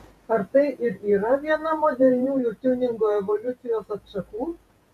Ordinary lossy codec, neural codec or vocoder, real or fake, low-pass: AAC, 96 kbps; vocoder, 48 kHz, 128 mel bands, Vocos; fake; 14.4 kHz